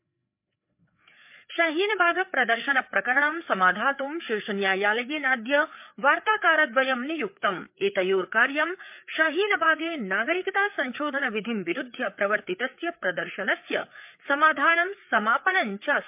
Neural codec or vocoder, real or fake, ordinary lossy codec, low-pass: codec, 16 kHz, 4 kbps, FreqCodec, larger model; fake; MP3, 32 kbps; 3.6 kHz